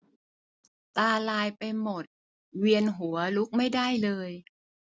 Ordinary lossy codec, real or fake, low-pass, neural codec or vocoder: none; real; none; none